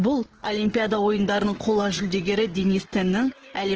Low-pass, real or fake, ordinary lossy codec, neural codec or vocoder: 7.2 kHz; fake; Opus, 16 kbps; codec, 16 kHz, 16 kbps, FreqCodec, smaller model